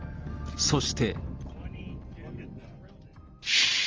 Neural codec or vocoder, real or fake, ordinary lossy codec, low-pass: none; real; Opus, 24 kbps; 7.2 kHz